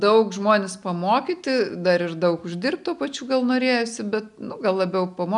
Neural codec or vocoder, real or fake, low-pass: none; real; 10.8 kHz